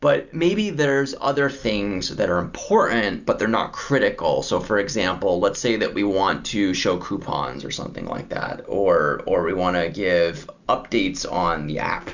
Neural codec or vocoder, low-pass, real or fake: none; 7.2 kHz; real